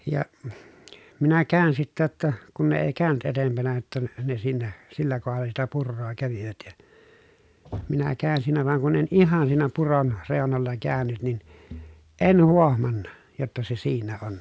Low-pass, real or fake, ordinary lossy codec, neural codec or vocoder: none; real; none; none